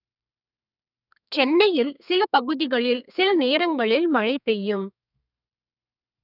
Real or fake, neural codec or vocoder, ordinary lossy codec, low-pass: fake; codec, 32 kHz, 1.9 kbps, SNAC; none; 5.4 kHz